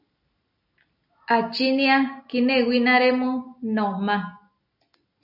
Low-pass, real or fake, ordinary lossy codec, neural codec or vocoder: 5.4 kHz; real; MP3, 48 kbps; none